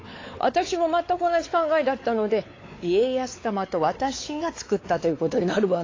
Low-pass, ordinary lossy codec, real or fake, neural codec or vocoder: 7.2 kHz; AAC, 32 kbps; fake; codec, 16 kHz, 4 kbps, X-Codec, WavLM features, trained on Multilingual LibriSpeech